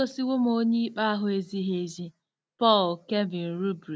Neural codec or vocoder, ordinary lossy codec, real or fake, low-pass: none; none; real; none